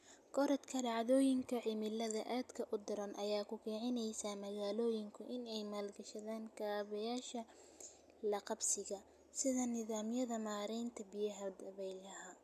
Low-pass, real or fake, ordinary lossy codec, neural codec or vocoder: 14.4 kHz; real; none; none